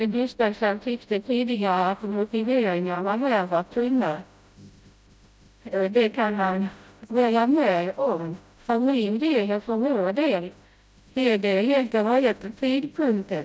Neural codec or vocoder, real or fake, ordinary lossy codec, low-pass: codec, 16 kHz, 0.5 kbps, FreqCodec, smaller model; fake; none; none